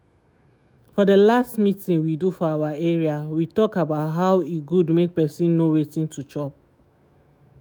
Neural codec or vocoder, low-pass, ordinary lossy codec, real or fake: autoencoder, 48 kHz, 128 numbers a frame, DAC-VAE, trained on Japanese speech; none; none; fake